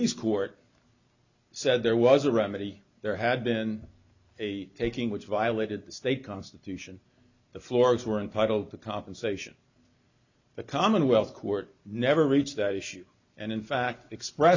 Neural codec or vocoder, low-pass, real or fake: none; 7.2 kHz; real